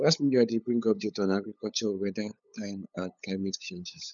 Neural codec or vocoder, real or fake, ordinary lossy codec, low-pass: codec, 16 kHz, 8 kbps, FunCodec, trained on LibriTTS, 25 frames a second; fake; none; 7.2 kHz